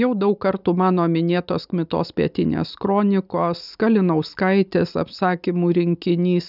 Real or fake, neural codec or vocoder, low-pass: real; none; 5.4 kHz